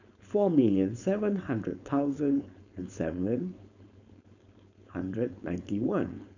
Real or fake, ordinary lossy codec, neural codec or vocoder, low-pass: fake; none; codec, 16 kHz, 4.8 kbps, FACodec; 7.2 kHz